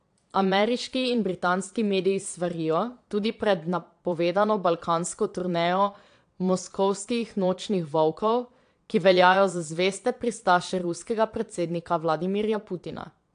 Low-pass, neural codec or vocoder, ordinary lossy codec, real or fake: 9.9 kHz; vocoder, 22.05 kHz, 80 mel bands, Vocos; AAC, 64 kbps; fake